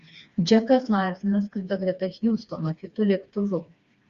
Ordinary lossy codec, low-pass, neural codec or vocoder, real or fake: Opus, 64 kbps; 7.2 kHz; codec, 16 kHz, 2 kbps, FreqCodec, smaller model; fake